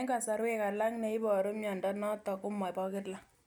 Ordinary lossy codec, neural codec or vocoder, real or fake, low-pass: none; none; real; none